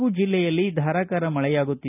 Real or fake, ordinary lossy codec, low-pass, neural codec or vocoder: real; none; 3.6 kHz; none